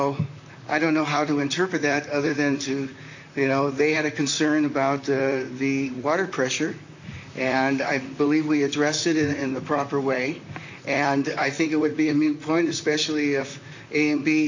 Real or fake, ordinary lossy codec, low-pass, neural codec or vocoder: fake; AAC, 48 kbps; 7.2 kHz; vocoder, 44.1 kHz, 128 mel bands, Pupu-Vocoder